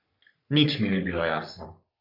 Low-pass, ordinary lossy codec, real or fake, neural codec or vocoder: 5.4 kHz; none; fake; codec, 44.1 kHz, 3.4 kbps, Pupu-Codec